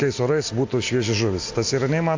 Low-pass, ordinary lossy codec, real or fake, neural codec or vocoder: 7.2 kHz; AAC, 48 kbps; real; none